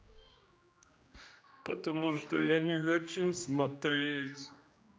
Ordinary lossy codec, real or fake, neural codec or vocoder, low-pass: none; fake; codec, 16 kHz, 1 kbps, X-Codec, HuBERT features, trained on general audio; none